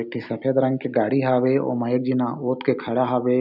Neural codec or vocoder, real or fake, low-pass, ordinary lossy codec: none; real; 5.4 kHz; none